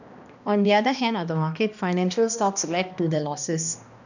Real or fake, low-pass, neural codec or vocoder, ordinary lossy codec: fake; 7.2 kHz; codec, 16 kHz, 1 kbps, X-Codec, HuBERT features, trained on balanced general audio; none